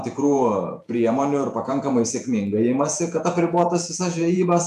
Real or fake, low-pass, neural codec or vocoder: fake; 14.4 kHz; vocoder, 48 kHz, 128 mel bands, Vocos